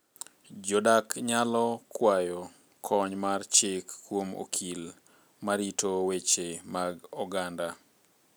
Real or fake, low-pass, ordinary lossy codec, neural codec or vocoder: real; none; none; none